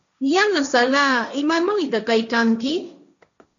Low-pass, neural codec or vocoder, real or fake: 7.2 kHz; codec, 16 kHz, 1.1 kbps, Voila-Tokenizer; fake